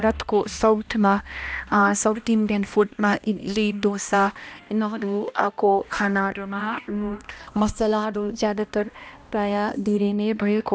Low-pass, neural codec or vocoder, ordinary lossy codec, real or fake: none; codec, 16 kHz, 1 kbps, X-Codec, HuBERT features, trained on balanced general audio; none; fake